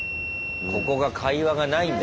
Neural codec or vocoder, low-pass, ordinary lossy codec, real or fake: none; none; none; real